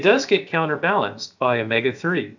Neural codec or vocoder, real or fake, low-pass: codec, 16 kHz, about 1 kbps, DyCAST, with the encoder's durations; fake; 7.2 kHz